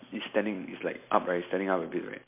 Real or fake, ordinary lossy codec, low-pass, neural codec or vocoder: real; MP3, 24 kbps; 3.6 kHz; none